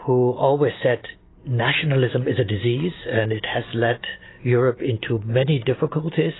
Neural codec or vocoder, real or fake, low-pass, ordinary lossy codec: none; real; 7.2 kHz; AAC, 16 kbps